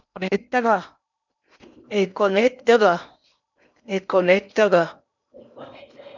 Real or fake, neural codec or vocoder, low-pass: fake; codec, 16 kHz in and 24 kHz out, 0.8 kbps, FocalCodec, streaming, 65536 codes; 7.2 kHz